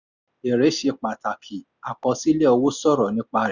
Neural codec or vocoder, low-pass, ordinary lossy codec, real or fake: none; 7.2 kHz; none; real